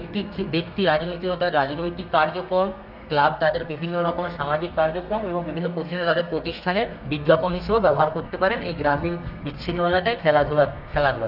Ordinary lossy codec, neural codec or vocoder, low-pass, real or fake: none; codec, 32 kHz, 1.9 kbps, SNAC; 5.4 kHz; fake